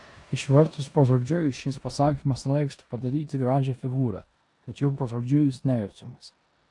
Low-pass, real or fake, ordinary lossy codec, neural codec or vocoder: 10.8 kHz; fake; AAC, 64 kbps; codec, 16 kHz in and 24 kHz out, 0.9 kbps, LongCat-Audio-Codec, four codebook decoder